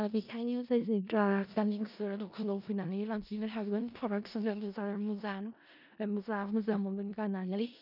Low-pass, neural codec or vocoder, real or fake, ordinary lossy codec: 5.4 kHz; codec, 16 kHz in and 24 kHz out, 0.4 kbps, LongCat-Audio-Codec, four codebook decoder; fake; none